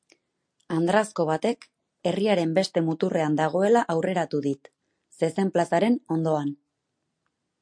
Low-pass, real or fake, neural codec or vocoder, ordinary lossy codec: 9.9 kHz; real; none; MP3, 48 kbps